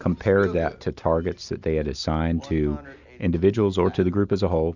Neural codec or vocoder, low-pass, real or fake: none; 7.2 kHz; real